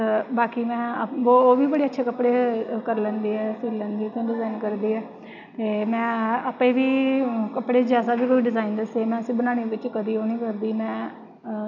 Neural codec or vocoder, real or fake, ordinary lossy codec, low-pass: none; real; none; 7.2 kHz